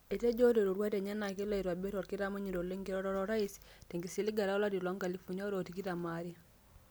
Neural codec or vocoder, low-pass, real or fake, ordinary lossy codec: none; none; real; none